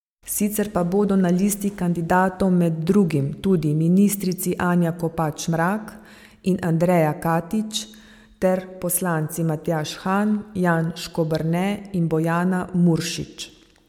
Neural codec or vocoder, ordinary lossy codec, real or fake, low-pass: none; MP3, 96 kbps; real; 19.8 kHz